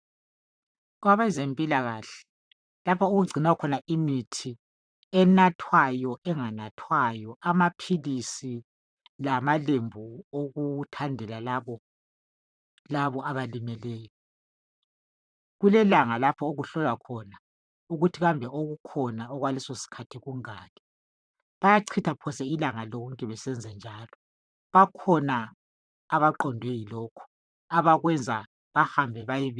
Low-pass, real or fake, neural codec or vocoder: 9.9 kHz; fake; vocoder, 44.1 kHz, 128 mel bands, Pupu-Vocoder